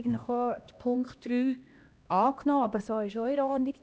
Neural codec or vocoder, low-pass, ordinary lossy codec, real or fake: codec, 16 kHz, 1 kbps, X-Codec, HuBERT features, trained on LibriSpeech; none; none; fake